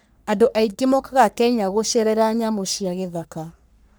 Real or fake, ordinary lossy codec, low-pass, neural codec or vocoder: fake; none; none; codec, 44.1 kHz, 3.4 kbps, Pupu-Codec